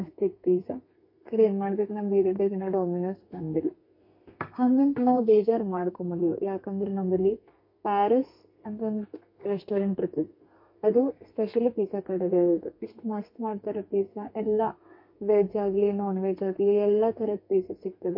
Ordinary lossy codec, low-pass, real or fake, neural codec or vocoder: MP3, 32 kbps; 5.4 kHz; fake; codec, 32 kHz, 1.9 kbps, SNAC